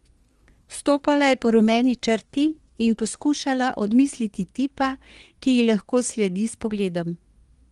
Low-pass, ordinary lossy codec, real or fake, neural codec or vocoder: 10.8 kHz; Opus, 24 kbps; fake; codec, 24 kHz, 1 kbps, SNAC